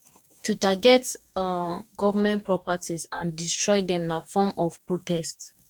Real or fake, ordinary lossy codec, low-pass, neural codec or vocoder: fake; none; 19.8 kHz; codec, 44.1 kHz, 2.6 kbps, DAC